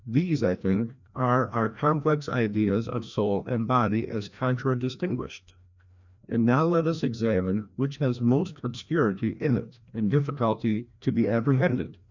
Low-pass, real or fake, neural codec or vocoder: 7.2 kHz; fake; codec, 16 kHz, 1 kbps, FreqCodec, larger model